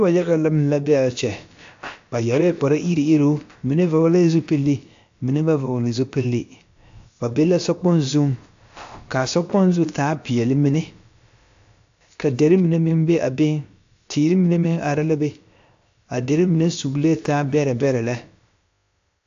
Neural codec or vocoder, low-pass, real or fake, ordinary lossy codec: codec, 16 kHz, about 1 kbps, DyCAST, with the encoder's durations; 7.2 kHz; fake; AAC, 64 kbps